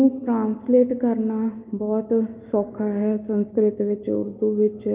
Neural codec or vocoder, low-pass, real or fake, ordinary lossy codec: none; 3.6 kHz; real; Opus, 32 kbps